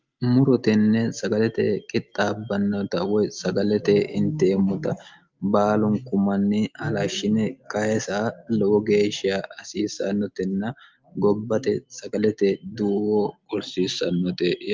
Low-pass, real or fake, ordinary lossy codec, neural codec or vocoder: 7.2 kHz; real; Opus, 24 kbps; none